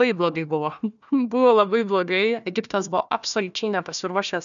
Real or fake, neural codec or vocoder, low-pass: fake; codec, 16 kHz, 1 kbps, FunCodec, trained on Chinese and English, 50 frames a second; 7.2 kHz